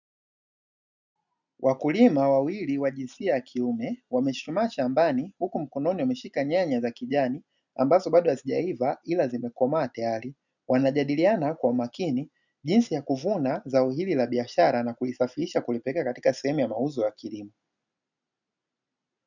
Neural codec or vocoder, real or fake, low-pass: none; real; 7.2 kHz